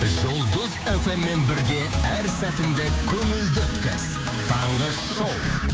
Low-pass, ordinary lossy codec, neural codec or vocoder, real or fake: none; none; codec, 16 kHz, 6 kbps, DAC; fake